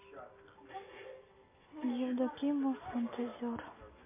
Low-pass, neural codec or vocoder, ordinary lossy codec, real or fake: 3.6 kHz; none; MP3, 32 kbps; real